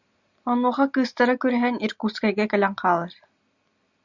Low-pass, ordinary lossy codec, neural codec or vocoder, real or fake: 7.2 kHz; Opus, 64 kbps; none; real